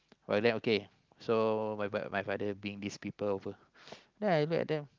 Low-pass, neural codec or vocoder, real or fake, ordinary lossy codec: 7.2 kHz; none; real; Opus, 32 kbps